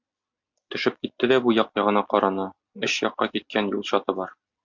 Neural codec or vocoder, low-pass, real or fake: none; 7.2 kHz; real